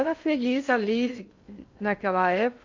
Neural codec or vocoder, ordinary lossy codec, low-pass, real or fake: codec, 16 kHz in and 24 kHz out, 0.6 kbps, FocalCodec, streaming, 2048 codes; MP3, 64 kbps; 7.2 kHz; fake